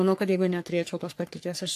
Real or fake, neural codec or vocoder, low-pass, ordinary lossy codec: fake; codec, 44.1 kHz, 3.4 kbps, Pupu-Codec; 14.4 kHz; AAC, 64 kbps